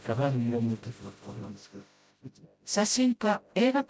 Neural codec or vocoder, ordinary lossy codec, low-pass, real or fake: codec, 16 kHz, 0.5 kbps, FreqCodec, smaller model; none; none; fake